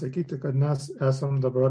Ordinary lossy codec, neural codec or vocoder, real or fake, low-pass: AAC, 64 kbps; none; real; 9.9 kHz